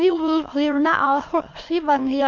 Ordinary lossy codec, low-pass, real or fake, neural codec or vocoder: MP3, 48 kbps; 7.2 kHz; fake; autoencoder, 22.05 kHz, a latent of 192 numbers a frame, VITS, trained on many speakers